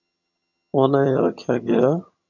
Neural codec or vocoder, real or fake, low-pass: vocoder, 22.05 kHz, 80 mel bands, HiFi-GAN; fake; 7.2 kHz